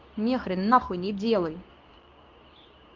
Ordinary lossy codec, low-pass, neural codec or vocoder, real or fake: Opus, 24 kbps; 7.2 kHz; codec, 24 kHz, 0.9 kbps, WavTokenizer, medium speech release version 2; fake